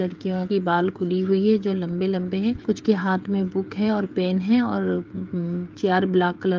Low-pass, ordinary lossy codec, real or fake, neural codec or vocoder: 7.2 kHz; Opus, 24 kbps; fake; codec, 16 kHz, 16 kbps, FreqCodec, smaller model